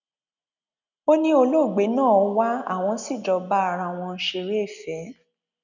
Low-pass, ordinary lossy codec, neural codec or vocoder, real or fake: 7.2 kHz; none; none; real